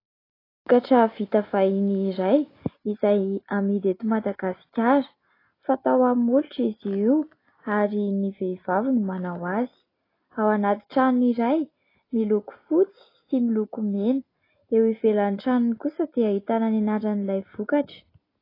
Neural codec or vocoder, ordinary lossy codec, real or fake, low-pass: none; AAC, 24 kbps; real; 5.4 kHz